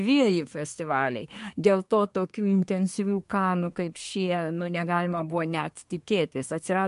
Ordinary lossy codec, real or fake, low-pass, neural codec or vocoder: MP3, 64 kbps; fake; 10.8 kHz; codec, 24 kHz, 1 kbps, SNAC